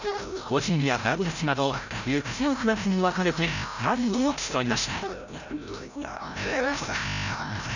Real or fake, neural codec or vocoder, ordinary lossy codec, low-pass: fake; codec, 16 kHz, 0.5 kbps, FreqCodec, larger model; none; 7.2 kHz